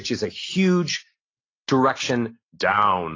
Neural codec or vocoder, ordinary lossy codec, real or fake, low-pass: none; AAC, 32 kbps; real; 7.2 kHz